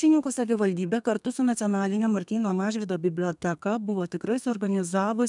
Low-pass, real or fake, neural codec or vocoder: 10.8 kHz; fake; codec, 32 kHz, 1.9 kbps, SNAC